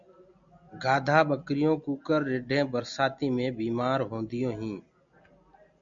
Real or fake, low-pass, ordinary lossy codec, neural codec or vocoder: real; 7.2 kHz; MP3, 96 kbps; none